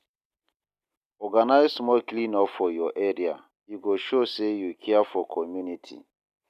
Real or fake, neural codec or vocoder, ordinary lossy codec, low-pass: real; none; none; 14.4 kHz